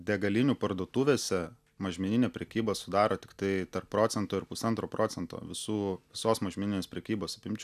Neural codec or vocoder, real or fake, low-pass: none; real; 14.4 kHz